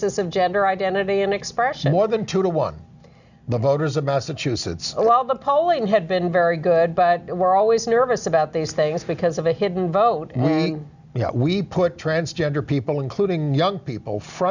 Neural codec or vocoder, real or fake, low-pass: none; real; 7.2 kHz